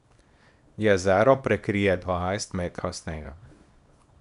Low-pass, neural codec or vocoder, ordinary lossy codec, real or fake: 10.8 kHz; codec, 24 kHz, 0.9 kbps, WavTokenizer, small release; none; fake